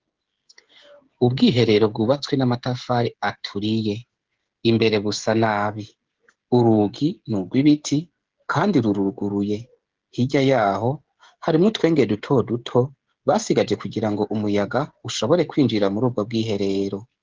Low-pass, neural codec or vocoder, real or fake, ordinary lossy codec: 7.2 kHz; codec, 16 kHz, 16 kbps, FreqCodec, smaller model; fake; Opus, 16 kbps